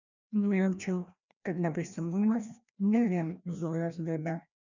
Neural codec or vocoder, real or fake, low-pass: codec, 16 kHz, 1 kbps, FreqCodec, larger model; fake; 7.2 kHz